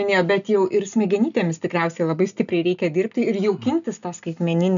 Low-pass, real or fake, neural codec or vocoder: 7.2 kHz; real; none